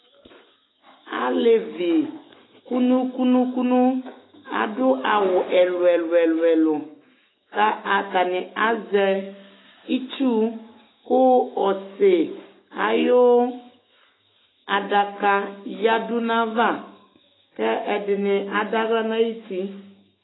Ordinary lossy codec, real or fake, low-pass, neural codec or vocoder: AAC, 16 kbps; fake; 7.2 kHz; autoencoder, 48 kHz, 128 numbers a frame, DAC-VAE, trained on Japanese speech